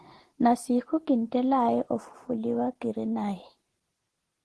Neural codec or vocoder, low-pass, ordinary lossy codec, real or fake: none; 10.8 kHz; Opus, 16 kbps; real